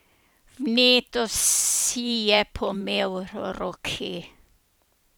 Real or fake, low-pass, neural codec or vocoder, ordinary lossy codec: fake; none; vocoder, 44.1 kHz, 128 mel bands every 512 samples, BigVGAN v2; none